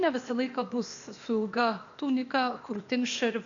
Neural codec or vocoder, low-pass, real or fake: codec, 16 kHz, 0.8 kbps, ZipCodec; 7.2 kHz; fake